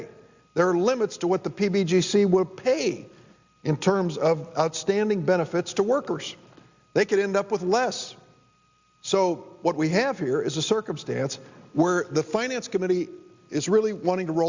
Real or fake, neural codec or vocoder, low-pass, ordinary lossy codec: real; none; 7.2 kHz; Opus, 64 kbps